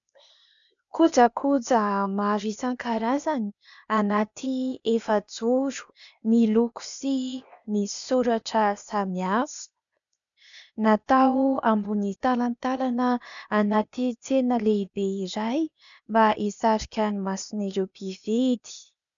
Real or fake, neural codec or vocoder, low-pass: fake; codec, 16 kHz, 0.8 kbps, ZipCodec; 7.2 kHz